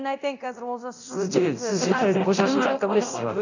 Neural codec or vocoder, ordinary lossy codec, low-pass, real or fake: codec, 24 kHz, 0.9 kbps, DualCodec; none; 7.2 kHz; fake